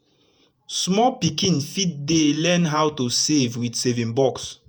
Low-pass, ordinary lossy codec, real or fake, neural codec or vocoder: none; none; fake; vocoder, 48 kHz, 128 mel bands, Vocos